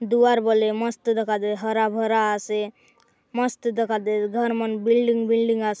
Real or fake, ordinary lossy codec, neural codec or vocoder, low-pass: real; none; none; none